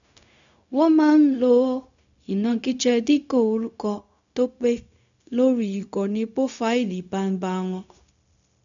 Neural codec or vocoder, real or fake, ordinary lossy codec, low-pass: codec, 16 kHz, 0.4 kbps, LongCat-Audio-Codec; fake; none; 7.2 kHz